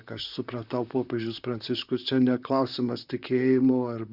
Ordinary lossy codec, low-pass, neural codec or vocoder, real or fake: Opus, 64 kbps; 5.4 kHz; vocoder, 44.1 kHz, 128 mel bands, Pupu-Vocoder; fake